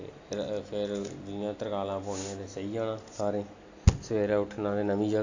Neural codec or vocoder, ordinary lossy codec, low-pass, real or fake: none; AAC, 48 kbps; 7.2 kHz; real